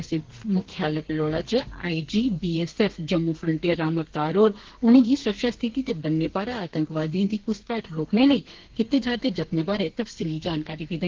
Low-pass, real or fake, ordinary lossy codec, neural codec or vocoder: 7.2 kHz; fake; Opus, 16 kbps; codec, 32 kHz, 1.9 kbps, SNAC